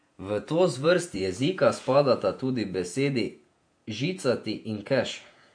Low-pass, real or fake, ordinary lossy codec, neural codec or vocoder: 9.9 kHz; fake; MP3, 48 kbps; vocoder, 48 kHz, 128 mel bands, Vocos